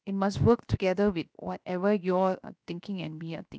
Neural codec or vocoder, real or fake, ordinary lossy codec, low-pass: codec, 16 kHz, 0.7 kbps, FocalCodec; fake; none; none